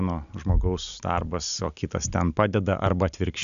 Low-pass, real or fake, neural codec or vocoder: 7.2 kHz; real; none